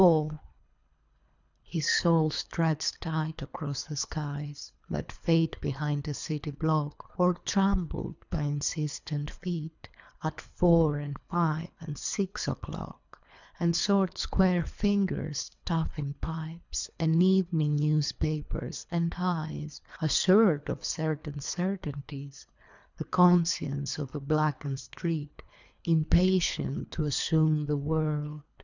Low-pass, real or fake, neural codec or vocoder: 7.2 kHz; fake; codec, 24 kHz, 3 kbps, HILCodec